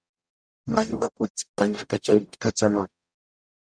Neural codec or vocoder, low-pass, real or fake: codec, 44.1 kHz, 0.9 kbps, DAC; 9.9 kHz; fake